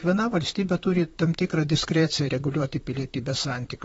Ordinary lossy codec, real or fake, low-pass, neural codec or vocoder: AAC, 24 kbps; fake; 19.8 kHz; vocoder, 44.1 kHz, 128 mel bands, Pupu-Vocoder